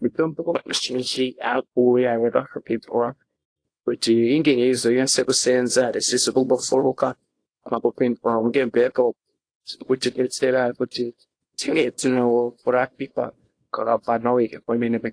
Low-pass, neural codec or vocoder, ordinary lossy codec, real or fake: 9.9 kHz; codec, 24 kHz, 0.9 kbps, WavTokenizer, small release; AAC, 48 kbps; fake